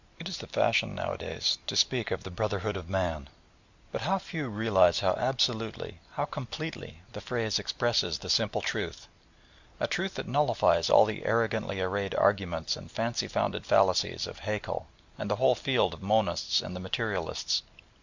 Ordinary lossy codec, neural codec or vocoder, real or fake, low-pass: Opus, 64 kbps; none; real; 7.2 kHz